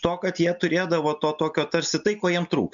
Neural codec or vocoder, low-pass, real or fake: none; 7.2 kHz; real